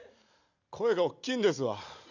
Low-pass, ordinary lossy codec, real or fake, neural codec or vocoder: 7.2 kHz; none; real; none